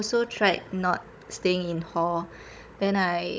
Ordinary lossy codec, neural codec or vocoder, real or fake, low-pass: none; codec, 16 kHz, 16 kbps, FunCodec, trained on Chinese and English, 50 frames a second; fake; none